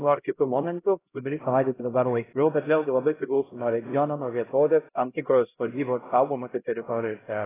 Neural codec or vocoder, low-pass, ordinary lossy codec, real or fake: codec, 16 kHz, 0.5 kbps, X-Codec, HuBERT features, trained on LibriSpeech; 3.6 kHz; AAC, 16 kbps; fake